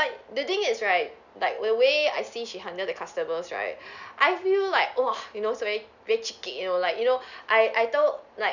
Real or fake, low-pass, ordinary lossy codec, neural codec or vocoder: real; 7.2 kHz; none; none